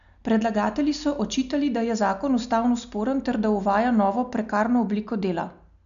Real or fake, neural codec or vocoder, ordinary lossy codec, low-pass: real; none; none; 7.2 kHz